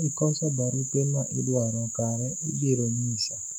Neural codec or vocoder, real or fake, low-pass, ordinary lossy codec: autoencoder, 48 kHz, 128 numbers a frame, DAC-VAE, trained on Japanese speech; fake; 19.8 kHz; none